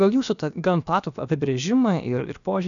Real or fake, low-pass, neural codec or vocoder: fake; 7.2 kHz; codec, 16 kHz, about 1 kbps, DyCAST, with the encoder's durations